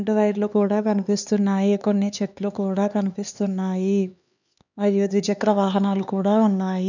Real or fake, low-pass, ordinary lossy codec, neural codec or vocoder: fake; 7.2 kHz; none; codec, 16 kHz, 4 kbps, X-Codec, HuBERT features, trained on LibriSpeech